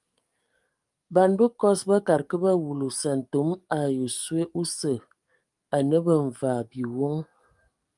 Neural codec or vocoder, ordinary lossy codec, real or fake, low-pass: codec, 44.1 kHz, 7.8 kbps, DAC; Opus, 32 kbps; fake; 10.8 kHz